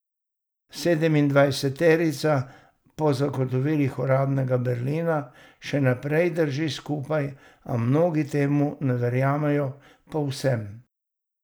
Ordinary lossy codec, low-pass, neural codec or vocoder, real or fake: none; none; none; real